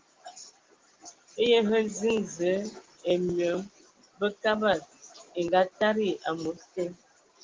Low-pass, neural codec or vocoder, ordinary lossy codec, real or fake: 7.2 kHz; none; Opus, 16 kbps; real